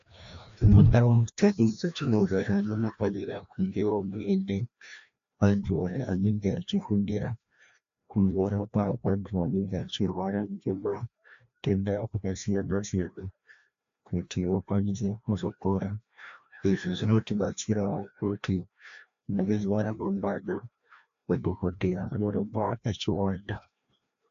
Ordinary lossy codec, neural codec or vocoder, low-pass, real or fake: AAC, 64 kbps; codec, 16 kHz, 1 kbps, FreqCodec, larger model; 7.2 kHz; fake